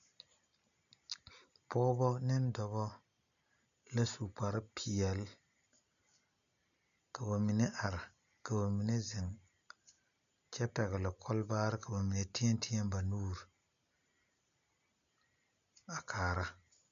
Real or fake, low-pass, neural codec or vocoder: real; 7.2 kHz; none